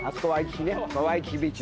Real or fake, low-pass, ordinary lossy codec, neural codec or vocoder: real; none; none; none